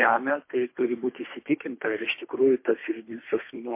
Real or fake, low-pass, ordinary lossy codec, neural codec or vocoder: fake; 3.6 kHz; MP3, 24 kbps; codec, 24 kHz, 3 kbps, HILCodec